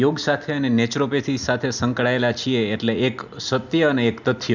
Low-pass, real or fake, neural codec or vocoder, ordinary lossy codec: 7.2 kHz; real; none; none